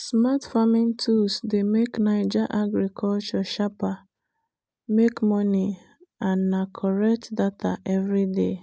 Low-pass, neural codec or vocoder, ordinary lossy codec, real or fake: none; none; none; real